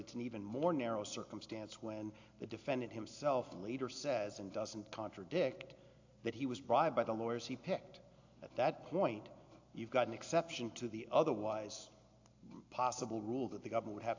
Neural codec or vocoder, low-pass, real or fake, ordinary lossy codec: none; 7.2 kHz; real; AAC, 48 kbps